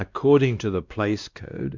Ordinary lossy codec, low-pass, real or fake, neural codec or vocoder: Opus, 64 kbps; 7.2 kHz; fake; codec, 16 kHz, 1 kbps, X-Codec, WavLM features, trained on Multilingual LibriSpeech